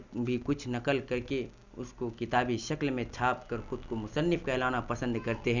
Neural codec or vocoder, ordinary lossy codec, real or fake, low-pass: none; none; real; 7.2 kHz